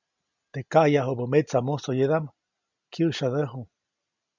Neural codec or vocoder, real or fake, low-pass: none; real; 7.2 kHz